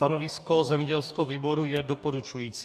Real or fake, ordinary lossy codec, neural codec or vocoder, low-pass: fake; AAC, 96 kbps; codec, 44.1 kHz, 2.6 kbps, DAC; 14.4 kHz